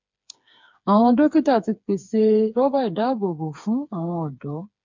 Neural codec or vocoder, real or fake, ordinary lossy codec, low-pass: codec, 16 kHz, 4 kbps, FreqCodec, smaller model; fake; MP3, 64 kbps; 7.2 kHz